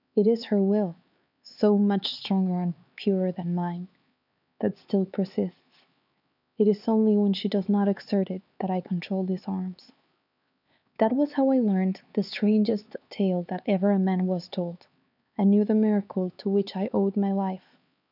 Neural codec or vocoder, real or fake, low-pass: codec, 16 kHz, 4 kbps, X-Codec, HuBERT features, trained on LibriSpeech; fake; 5.4 kHz